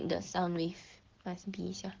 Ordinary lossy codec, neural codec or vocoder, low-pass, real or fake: Opus, 16 kbps; none; 7.2 kHz; real